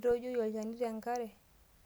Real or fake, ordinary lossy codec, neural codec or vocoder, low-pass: real; none; none; none